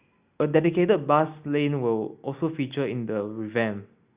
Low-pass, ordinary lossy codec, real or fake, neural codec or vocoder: 3.6 kHz; Opus, 64 kbps; real; none